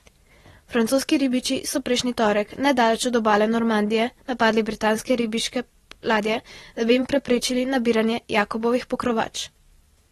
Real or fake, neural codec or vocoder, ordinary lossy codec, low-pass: fake; vocoder, 44.1 kHz, 128 mel bands every 512 samples, BigVGAN v2; AAC, 32 kbps; 19.8 kHz